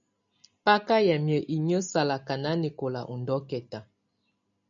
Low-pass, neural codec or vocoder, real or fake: 7.2 kHz; none; real